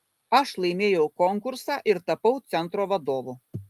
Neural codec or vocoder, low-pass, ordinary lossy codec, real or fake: none; 14.4 kHz; Opus, 32 kbps; real